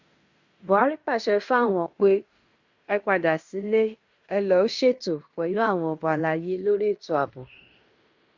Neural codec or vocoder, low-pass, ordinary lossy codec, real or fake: codec, 16 kHz in and 24 kHz out, 0.9 kbps, LongCat-Audio-Codec, fine tuned four codebook decoder; 7.2 kHz; Opus, 64 kbps; fake